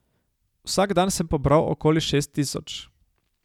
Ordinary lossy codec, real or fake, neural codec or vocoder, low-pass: none; real; none; 19.8 kHz